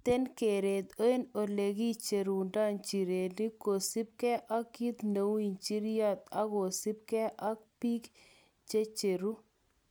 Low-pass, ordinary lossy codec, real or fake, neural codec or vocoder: none; none; real; none